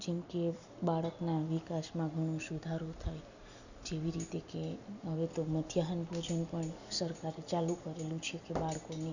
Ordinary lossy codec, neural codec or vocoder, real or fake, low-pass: none; none; real; 7.2 kHz